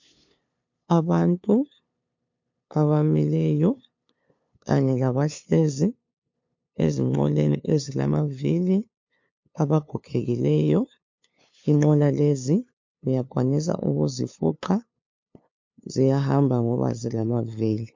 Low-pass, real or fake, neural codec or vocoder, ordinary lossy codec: 7.2 kHz; fake; codec, 16 kHz, 2 kbps, FunCodec, trained on LibriTTS, 25 frames a second; MP3, 48 kbps